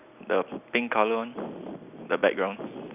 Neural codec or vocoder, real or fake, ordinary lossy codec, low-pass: none; real; none; 3.6 kHz